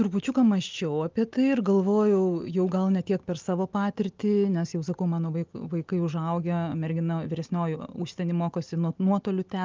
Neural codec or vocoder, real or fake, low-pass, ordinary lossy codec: none; real; 7.2 kHz; Opus, 32 kbps